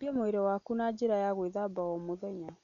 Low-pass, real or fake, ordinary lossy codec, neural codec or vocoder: 7.2 kHz; real; none; none